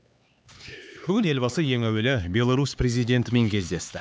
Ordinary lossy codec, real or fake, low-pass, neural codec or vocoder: none; fake; none; codec, 16 kHz, 4 kbps, X-Codec, HuBERT features, trained on LibriSpeech